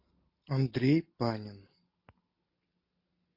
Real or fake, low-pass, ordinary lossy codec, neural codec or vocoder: real; 5.4 kHz; MP3, 32 kbps; none